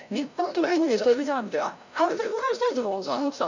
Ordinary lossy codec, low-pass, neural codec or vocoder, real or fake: none; 7.2 kHz; codec, 16 kHz, 0.5 kbps, FreqCodec, larger model; fake